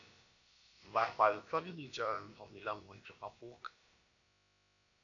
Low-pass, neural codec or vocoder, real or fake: 7.2 kHz; codec, 16 kHz, about 1 kbps, DyCAST, with the encoder's durations; fake